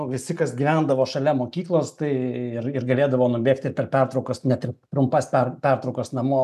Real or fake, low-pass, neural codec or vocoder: real; 14.4 kHz; none